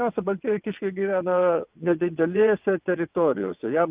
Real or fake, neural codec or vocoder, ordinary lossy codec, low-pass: fake; vocoder, 22.05 kHz, 80 mel bands, WaveNeXt; Opus, 24 kbps; 3.6 kHz